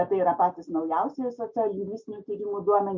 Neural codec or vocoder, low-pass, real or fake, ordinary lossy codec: none; 7.2 kHz; real; MP3, 64 kbps